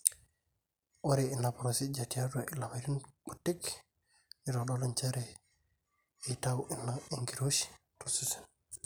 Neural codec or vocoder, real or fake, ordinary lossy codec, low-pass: none; real; none; none